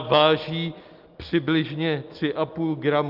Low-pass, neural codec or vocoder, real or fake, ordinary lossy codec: 5.4 kHz; none; real; Opus, 32 kbps